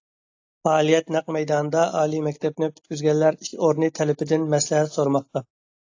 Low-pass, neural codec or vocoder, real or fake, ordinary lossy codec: 7.2 kHz; none; real; AAC, 48 kbps